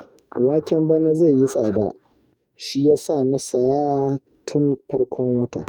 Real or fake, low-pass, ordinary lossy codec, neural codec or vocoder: fake; 19.8 kHz; none; codec, 44.1 kHz, 2.6 kbps, DAC